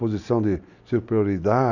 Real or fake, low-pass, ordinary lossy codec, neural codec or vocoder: real; 7.2 kHz; none; none